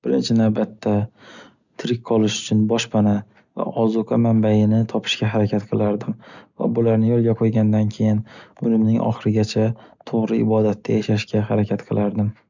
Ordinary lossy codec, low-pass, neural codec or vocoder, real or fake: none; 7.2 kHz; none; real